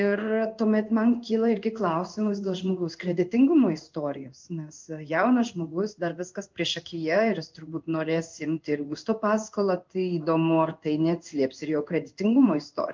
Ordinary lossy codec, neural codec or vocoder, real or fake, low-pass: Opus, 24 kbps; codec, 16 kHz in and 24 kHz out, 1 kbps, XY-Tokenizer; fake; 7.2 kHz